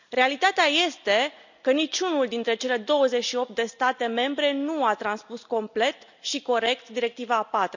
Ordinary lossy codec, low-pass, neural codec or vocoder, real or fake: none; 7.2 kHz; none; real